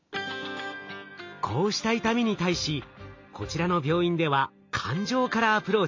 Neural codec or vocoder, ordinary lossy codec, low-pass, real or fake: none; MP3, 48 kbps; 7.2 kHz; real